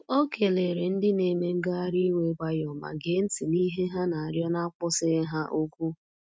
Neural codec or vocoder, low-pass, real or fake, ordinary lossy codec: none; none; real; none